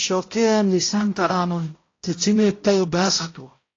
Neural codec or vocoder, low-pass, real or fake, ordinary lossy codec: codec, 16 kHz, 0.5 kbps, X-Codec, HuBERT features, trained on balanced general audio; 7.2 kHz; fake; AAC, 32 kbps